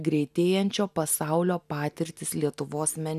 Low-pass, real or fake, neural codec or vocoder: 14.4 kHz; real; none